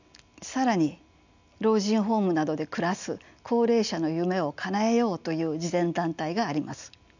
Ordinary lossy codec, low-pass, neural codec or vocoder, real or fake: none; 7.2 kHz; none; real